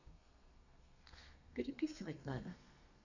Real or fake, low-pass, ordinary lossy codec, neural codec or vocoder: fake; 7.2 kHz; none; codec, 32 kHz, 1.9 kbps, SNAC